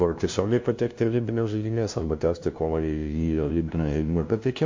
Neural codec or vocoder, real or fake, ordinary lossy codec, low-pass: codec, 16 kHz, 0.5 kbps, FunCodec, trained on LibriTTS, 25 frames a second; fake; MP3, 48 kbps; 7.2 kHz